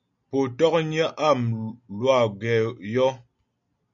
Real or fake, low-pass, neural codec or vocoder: real; 7.2 kHz; none